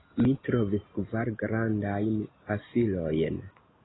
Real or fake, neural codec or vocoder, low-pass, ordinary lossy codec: real; none; 7.2 kHz; AAC, 16 kbps